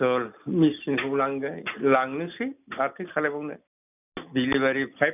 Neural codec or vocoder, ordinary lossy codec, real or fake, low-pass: none; none; real; 3.6 kHz